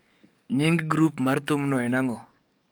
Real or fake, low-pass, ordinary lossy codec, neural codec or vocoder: fake; none; none; codec, 44.1 kHz, 7.8 kbps, DAC